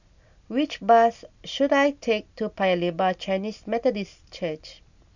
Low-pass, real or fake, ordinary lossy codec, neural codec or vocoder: 7.2 kHz; real; none; none